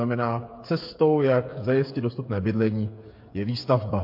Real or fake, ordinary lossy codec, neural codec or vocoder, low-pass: fake; MP3, 32 kbps; codec, 16 kHz, 8 kbps, FreqCodec, smaller model; 5.4 kHz